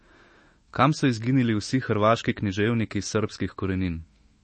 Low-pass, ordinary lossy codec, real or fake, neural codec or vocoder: 10.8 kHz; MP3, 32 kbps; fake; autoencoder, 48 kHz, 128 numbers a frame, DAC-VAE, trained on Japanese speech